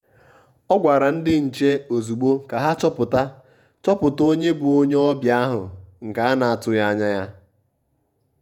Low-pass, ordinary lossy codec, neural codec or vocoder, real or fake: 19.8 kHz; none; vocoder, 48 kHz, 128 mel bands, Vocos; fake